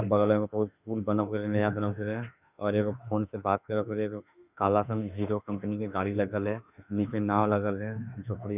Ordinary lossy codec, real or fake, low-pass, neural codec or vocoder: none; fake; 3.6 kHz; autoencoder, 48 kHz, 32 numbers a frame, DAC-VAE, trained on Japanese speech